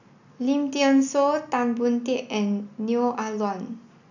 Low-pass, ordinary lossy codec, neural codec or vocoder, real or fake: 7.2 kHz; none; none; real